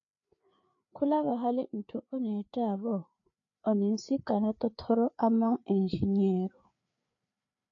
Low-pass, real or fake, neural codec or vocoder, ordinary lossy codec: 7.2 kHz; fake; codec, 16 kHz, 4 kbps, FreqCodec, larger model; AAC, 48 kbps